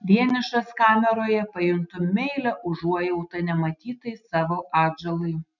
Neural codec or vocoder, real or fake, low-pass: none; real; 7.2 kHz